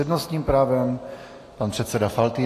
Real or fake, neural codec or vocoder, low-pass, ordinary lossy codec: real; none; 14.4 kHz; AAC, 48 kbps